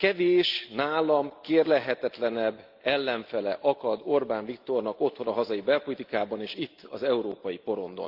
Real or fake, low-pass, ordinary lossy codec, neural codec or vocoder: real; 5.4 kHz; Opus, 32 kbps; none